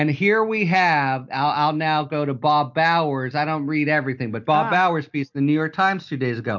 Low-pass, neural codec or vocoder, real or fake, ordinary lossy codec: 7.2 kHz; none; real; MP3, 48 kbps